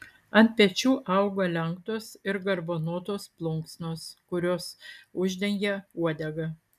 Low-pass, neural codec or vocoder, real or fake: 14.4 kHz; none; real